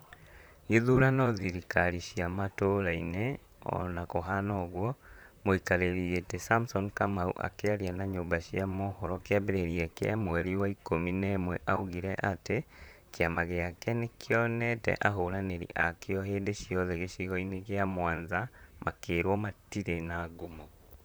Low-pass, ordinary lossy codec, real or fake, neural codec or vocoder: none; none; fake; vocoder, 44.1 kHz, 128 mel bands, Pupu-Vocoder